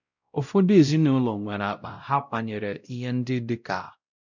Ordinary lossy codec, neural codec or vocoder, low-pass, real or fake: none; codec, 16 kHz, 0.5 kbps, X-Codec, WavLM features, trained on Multilingual LibriSpeech; 7.2 kHz; fake